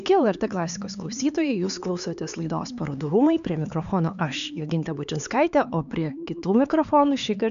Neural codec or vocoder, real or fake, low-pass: codec, 16 kHz, 4 kbps, X-Codec, HuBERT features, trained on LibriSpeech; fake; 7.2 kHz